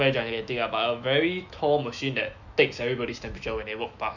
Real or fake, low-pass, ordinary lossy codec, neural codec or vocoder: fake; 7.2 kHz; none; autoencoder, 48 kHz, 128 numbers a frame, DAC-VAE, trained on Japanese speech